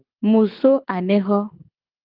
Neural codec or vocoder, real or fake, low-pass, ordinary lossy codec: codec, 24 kHz, 6 kbps, HILCodec; fake; 5.4 kHz; Opus, 32 kbps